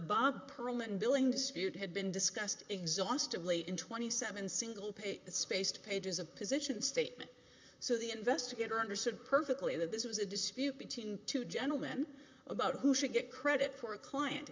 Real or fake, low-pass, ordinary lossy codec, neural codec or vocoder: fake; 7.2 kHz; MP3, 64 kbps; vocoder, 44.1 kHz, 128 mel bands, Pupu-Vocoder